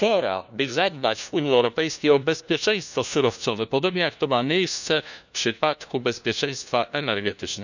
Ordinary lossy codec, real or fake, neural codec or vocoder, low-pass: none; fake; codec, 16 kHz, 1 kbps, FunCodec, trained on LibriTTS, 50 frames a second; 7.2 kHz